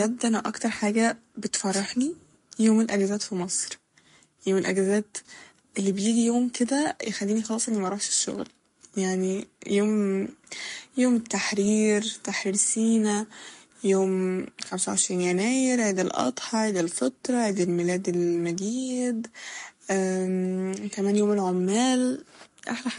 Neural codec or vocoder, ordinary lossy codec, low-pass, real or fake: codec, 44.1 kHz, 7.8 kbps, Pupu-Codec; MP3, 48 kbps; 14.4 kHz; fake